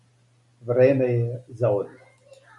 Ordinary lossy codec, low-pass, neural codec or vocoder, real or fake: MP3, 96 kbps; 10.8 kHz; none; real